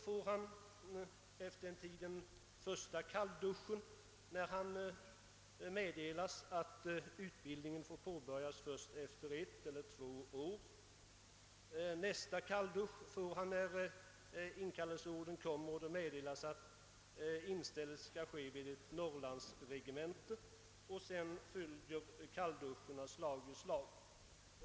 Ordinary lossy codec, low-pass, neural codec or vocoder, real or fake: none; none; none; real